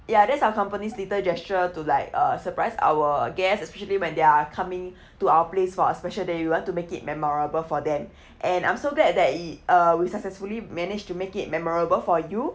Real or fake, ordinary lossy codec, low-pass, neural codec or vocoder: real; none; none; none